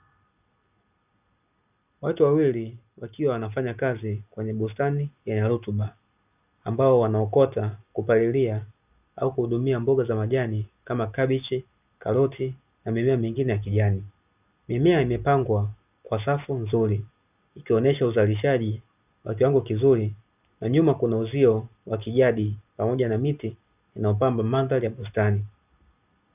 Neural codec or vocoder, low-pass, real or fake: none; 3.6 kHz; real